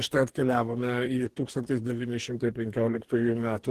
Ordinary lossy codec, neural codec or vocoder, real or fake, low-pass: Opus, 16 kbps; codec, 44.1 kHz, 2.6 kbps, DAC; fake; 14.4 kHz